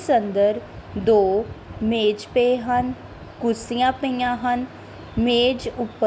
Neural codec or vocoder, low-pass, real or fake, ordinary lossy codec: none; none; real; none